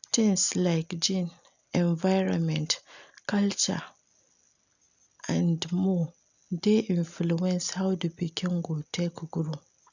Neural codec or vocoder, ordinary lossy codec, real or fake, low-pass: none; none; real; 7.2 kHz